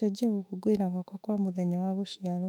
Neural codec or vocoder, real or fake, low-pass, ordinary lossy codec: autoencoder, 48 kHz, 32 numbers a frame, DAC-VAE, trained on Japanese speech; fake; 19.8 kHz; none